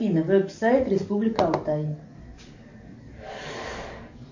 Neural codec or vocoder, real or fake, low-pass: codec, 44.1 kHz, 7.8 kbps, DAC; fake; 7.2 kHz